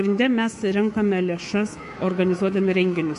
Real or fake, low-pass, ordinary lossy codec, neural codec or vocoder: fake; 10.8 kHz; MP3, 48 kbps; codec, 24 kHz, 3.1 kbps, DualCodec